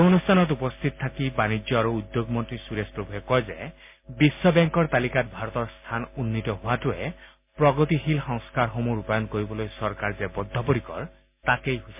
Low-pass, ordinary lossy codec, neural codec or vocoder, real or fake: 3.6 kHz; MP3, 32 kbps; none; real